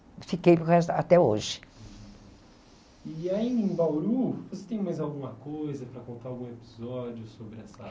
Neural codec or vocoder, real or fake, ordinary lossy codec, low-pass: none; real; none; none